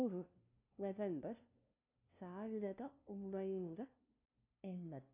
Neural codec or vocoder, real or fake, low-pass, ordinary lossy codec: codec, 16 kHz, 0.5 kbps, FunCodec, trained on LibriTTS, 25 frames a second; fake; 3.6 kHz; none